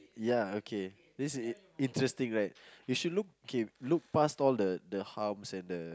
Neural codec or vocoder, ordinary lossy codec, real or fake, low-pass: none; none; real; none